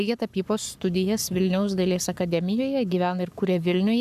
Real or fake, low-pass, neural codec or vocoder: fake; 14.4 kHz; codec, 44.1 kHz, 7.8 kbps, Pupu-Codec